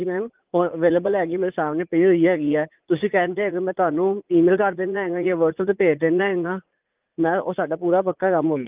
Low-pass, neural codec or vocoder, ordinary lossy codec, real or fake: 3.6 kHz; vocoder, 44.1 kHz, 80 mel bands, Vocos; Opus, 24 kbps; fake